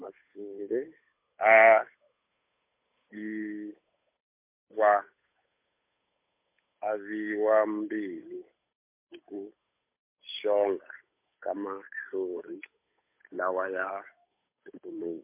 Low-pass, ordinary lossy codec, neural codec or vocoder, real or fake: 3.6 kHz; MP3, 24 kbps; codec, 16 kHz, 8 kbps, FunCodec, trained on Chinese and English, 25 frames a second; fake